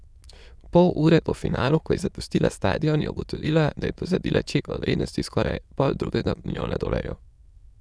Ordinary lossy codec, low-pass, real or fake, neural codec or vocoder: none; none; fake; autoencoder, 22.05 kHz, a latent of 192 numbers a frame, VITS, trained on many speakers